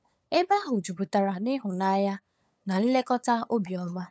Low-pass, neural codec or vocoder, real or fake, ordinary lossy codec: none; codec, 16 kHz, 8 kbps, FunCodec, trained on LibriTTS, 25 frames a second; fake; none